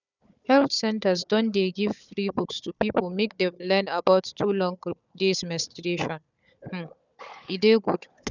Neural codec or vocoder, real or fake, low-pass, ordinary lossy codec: codec, 16 kHz, 4 kbps, FunCodec, trained on Chinese and English, 50 frames a second; fake; 7.2 kHz; none